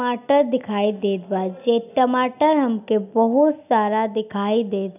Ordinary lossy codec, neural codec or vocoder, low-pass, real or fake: none; none; 3.6 kHz; real